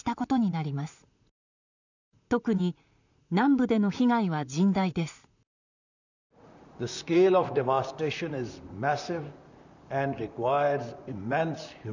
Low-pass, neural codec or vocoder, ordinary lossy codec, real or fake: 7.2 kHz; vocoder, 44.1 kHz, 128 mel bands, Pupu-Vocoder; none; fake